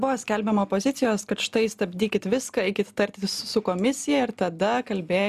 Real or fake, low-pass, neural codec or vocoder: real; 14.4 kHz; none